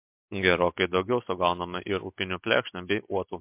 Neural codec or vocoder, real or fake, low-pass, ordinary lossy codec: none; real; 3.6 kHz; MP3, 32 kbps